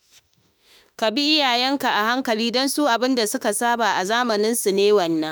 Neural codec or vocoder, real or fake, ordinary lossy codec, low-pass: autoencoder, 48 kHz, 32 numbers a frame, DAC-VAE, trained on Japanese speech; fake; none; none